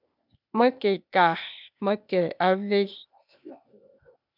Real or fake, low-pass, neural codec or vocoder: fake; 5.4 kHz; codec, 16 kHz, 0.8 kbps, ZipCodec